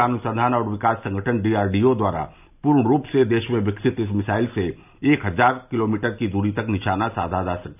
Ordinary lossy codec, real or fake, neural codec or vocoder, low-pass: none; real; none; 3.6 kHz